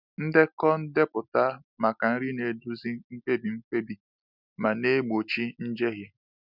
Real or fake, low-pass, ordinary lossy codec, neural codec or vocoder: real; 5.4 kHz; none; none